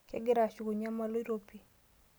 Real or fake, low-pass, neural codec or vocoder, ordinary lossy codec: real; none; none; none